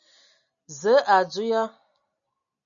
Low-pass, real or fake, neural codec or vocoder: 7.2 kHz; real; none